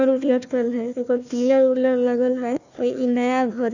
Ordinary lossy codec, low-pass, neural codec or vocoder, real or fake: none; 7.2 kHz; codec, 16 kHz, 1 kbps, FunCodec, trained on Chinese and English, 50 frames a second; fake